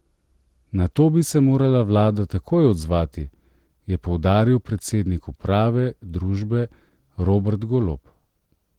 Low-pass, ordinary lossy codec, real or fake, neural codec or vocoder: 19.8 kHz; Opus, 16 kbps; real; none